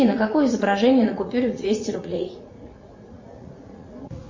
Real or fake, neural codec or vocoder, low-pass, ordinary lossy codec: fake; vocoder, 44.1 kHz, 80 mel bands, Vocos; 7.2 kHz; MP3, 32 kbps